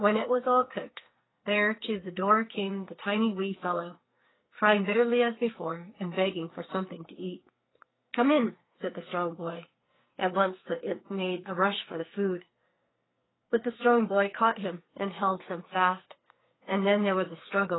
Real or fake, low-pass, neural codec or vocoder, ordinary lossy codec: fake; 7.2 kHz; codec, 44.1 kHz, 2.6 kbps, SNAC; AAC, 16 kbps